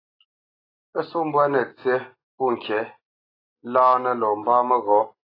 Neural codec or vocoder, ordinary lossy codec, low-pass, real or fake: none; AAC, 24 kbps; 5.4 kHz; real